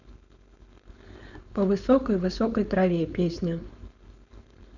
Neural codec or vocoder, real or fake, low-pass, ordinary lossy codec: codec, 16 kHz, 4.8 kbps, FACodec; fake; 7.2 kHz; none